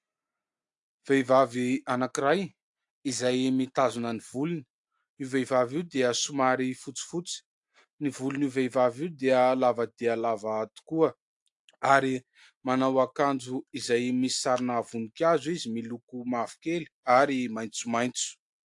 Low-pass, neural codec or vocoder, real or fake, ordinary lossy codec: 10.8 kHz; none; real; AAC, 64 kbps